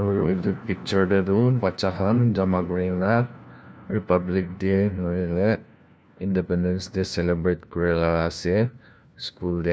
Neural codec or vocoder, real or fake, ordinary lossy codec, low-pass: codec, 16 kHz, 1 kbps, FunCodec, trained on LibriTTS, 50 frames a second; fake; none; none